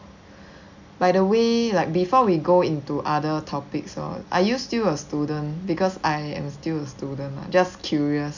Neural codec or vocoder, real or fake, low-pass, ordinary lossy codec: none; real; 7.2 kHz; none